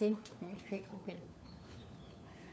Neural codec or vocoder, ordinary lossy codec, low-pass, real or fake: codec, 16 kHz, 4 kbps, FunCodec, trained on LibriTTS, 50 frames a second; none; none; fake